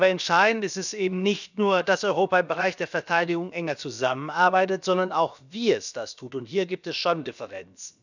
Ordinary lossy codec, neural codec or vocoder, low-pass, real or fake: none; codec, 16 kHz, about 1 kbps, DyCAST, with the encoder's durations; 7.2 kHz; fake